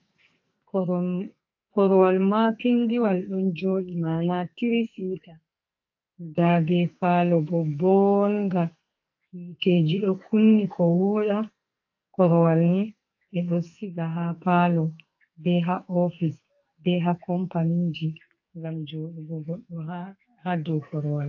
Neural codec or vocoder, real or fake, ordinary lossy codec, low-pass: codec, 44.1 kHz, 2.6 kbps, SNAC; fake; AAC, 48 kbps; 7.2 kHz